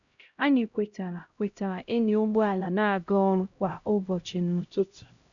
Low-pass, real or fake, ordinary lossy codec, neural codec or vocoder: 7.2 kHz; fake; none; codec, 16 kHz, 0.5 kbps, X-Codec, HuBERT features, trained on LibriSpeech